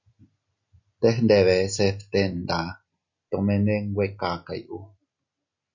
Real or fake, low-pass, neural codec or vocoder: real; 7.2 kHz; none